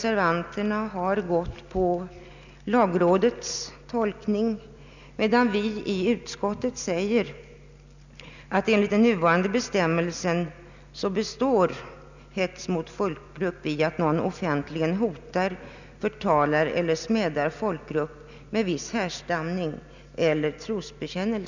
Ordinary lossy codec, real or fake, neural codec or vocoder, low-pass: none; real; none; 7.2 kHz